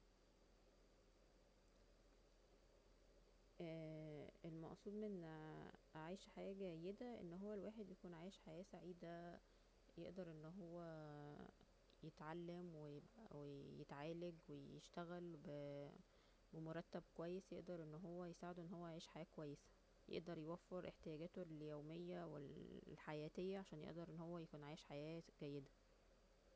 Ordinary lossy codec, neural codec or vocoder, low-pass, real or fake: none; none; none; real